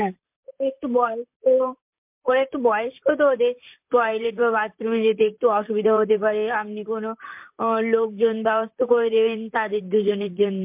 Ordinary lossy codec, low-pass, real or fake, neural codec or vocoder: MP3, 32 kbps; 3.6 kHz; fake; vocoder, 44.1 kHz, 128 mel bands, Pupu-Vocoder